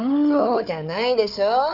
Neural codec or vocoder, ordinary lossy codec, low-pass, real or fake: codec, 16 kHz, 4 kbps, FunCodec, trained on Chinese and English, 50 frames a second; none; 5.4 kHz; fake